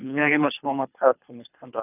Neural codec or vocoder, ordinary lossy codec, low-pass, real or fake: codec, 24 kHz, 3 kbps, HILCodec; none; 3.6 kHz; fake